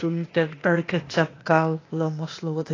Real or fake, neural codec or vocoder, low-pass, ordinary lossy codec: fake; codec, 16 kHz, 0.8 kbps, ZipCodec; 7.2 kHz; AAC, 32 kbps